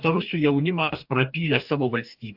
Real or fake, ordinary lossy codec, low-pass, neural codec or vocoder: fake; AAC, 48 kbps; 5.4 kHz; codec, 44.1 kHz, 2.6 kbps, DAC